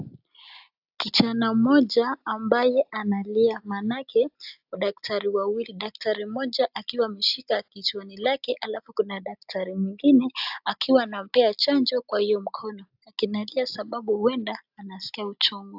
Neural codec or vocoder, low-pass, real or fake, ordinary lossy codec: none; 5.4 kHz; real; AAC, 48 kbps